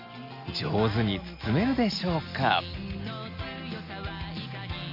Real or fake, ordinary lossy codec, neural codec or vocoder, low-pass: real; none; none; 5.4 kHz